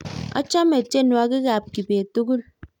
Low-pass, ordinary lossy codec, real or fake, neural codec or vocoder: 19.8 kHz; none; real; none